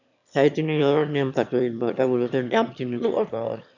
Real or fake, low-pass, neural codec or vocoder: fake; 7.2 kHz; autoencoder, 22.05 kHz, a latent of 192 numbers a frame, VITS, trained on one speaker